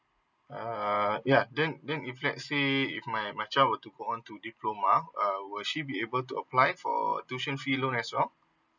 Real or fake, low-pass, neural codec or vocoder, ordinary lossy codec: real; 7.2 kHz; none; none